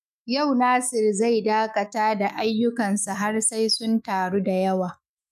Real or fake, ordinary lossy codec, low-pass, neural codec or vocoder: fake; none; 14.4 kHz; autoencoder, 48 kHz, 128 numbers a frame, DAC-VAE, trained on Japanese speech